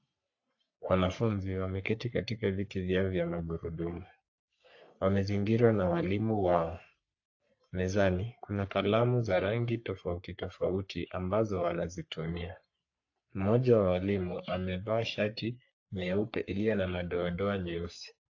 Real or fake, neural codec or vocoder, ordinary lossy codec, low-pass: fake; codec, 44.1 kHz, 3.4 kbps, Pupu-Codec; AAC, 48 kbps; 7.2 kHz